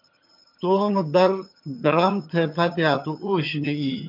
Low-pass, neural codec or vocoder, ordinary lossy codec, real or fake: 5.4 kHz; vocoder, 22.05 kHz, 80 mel bands, HiFi-GAN; MP3, 48 kbps; fake